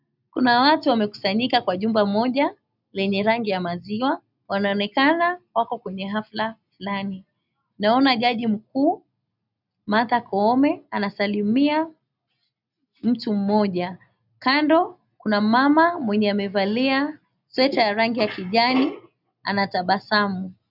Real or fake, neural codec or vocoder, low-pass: real; none; 5.4 kHz